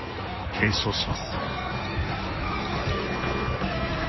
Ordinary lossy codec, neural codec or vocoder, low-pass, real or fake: MP3, 24 kbps; codec, 16 kHz in and 24 kHz out, 1.1 kbps, FireRedTTS-2 codec; 7.2 kHz; fake